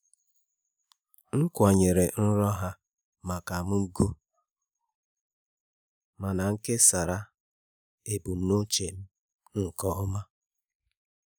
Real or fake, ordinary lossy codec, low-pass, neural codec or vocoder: real; none; none; none